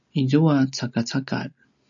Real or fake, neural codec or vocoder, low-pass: real; none; 7.2 kHz